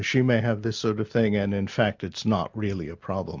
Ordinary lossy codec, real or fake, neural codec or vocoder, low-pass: MP3, 64 kbps; real; none; 7.2 kHz